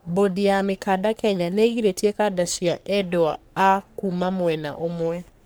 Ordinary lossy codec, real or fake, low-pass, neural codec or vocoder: none; fake; none; codec, 44.1 kHz, 3.4 kbps, Pupu-Codec